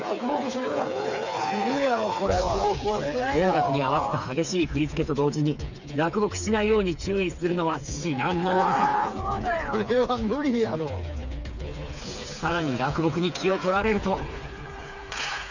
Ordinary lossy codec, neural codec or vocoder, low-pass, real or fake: none; codec, 16 kHz, 4 kbps, FreqCodec, smaller model; 7.2 kHz; fake